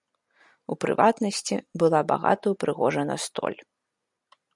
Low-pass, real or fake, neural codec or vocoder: 10.8 kHz; real; none